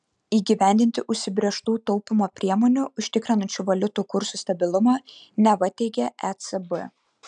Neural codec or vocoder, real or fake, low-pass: none; real; 9.9 kHz